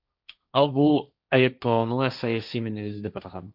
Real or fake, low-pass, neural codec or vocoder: fake; 5.4 kHz; codec, 16 kHz, 1.1 kbps, Voila-Tokenizer